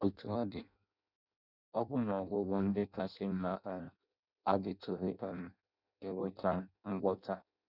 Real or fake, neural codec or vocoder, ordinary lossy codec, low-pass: fake; codec, 16 kHz in and 24 kHz out, 0.6 kbps, FireRedTTS-2 codec; none; 5.4 kHz